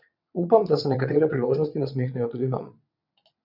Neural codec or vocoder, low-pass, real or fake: vocoder, 22.05 kHz, 80 mel bands, WaveNeXt; 5.4 kHz; fake